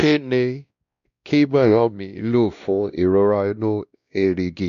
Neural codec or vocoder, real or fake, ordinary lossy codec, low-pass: codec, 16 kHz, 1 kbps, X-Codec, WavLM features, trained on Multilingual LibriSpeech; fake; none; 7.2 kHz